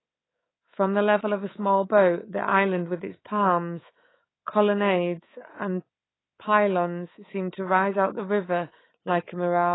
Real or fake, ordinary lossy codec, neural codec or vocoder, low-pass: fake; AAC, 16 kbps; codec, 24 kHz, 3.1 kbps, DualCodec; 7.2 kHz